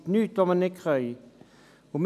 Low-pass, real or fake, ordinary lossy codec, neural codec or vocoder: 14.4 kHz; real; none; none